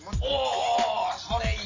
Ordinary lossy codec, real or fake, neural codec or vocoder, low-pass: none; real; none; 7.2 kHz